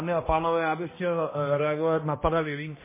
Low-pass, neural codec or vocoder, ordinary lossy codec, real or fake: 3.6 kHz; codec, 16 kHz, 1 kbps, X-Codec, HuBERT features, trained on balanced general audio; MP3, 16 kbps; fake